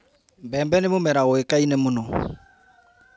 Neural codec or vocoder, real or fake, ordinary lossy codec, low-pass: none; real; none; none